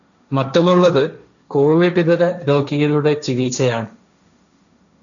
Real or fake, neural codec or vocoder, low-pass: fake; codec, 16 kHz, 1.1 kbps, Voila-Tokenizer; 7.2 kHz